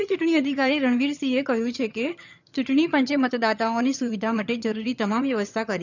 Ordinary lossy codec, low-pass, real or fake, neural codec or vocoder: none; 7.2 kHz; fake; vocoder, 22.05 kHz, 80 mel bands, HiFi-GAN